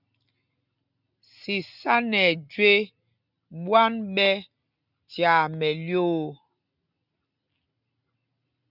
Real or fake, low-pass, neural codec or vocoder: real; 5.4 kHz; none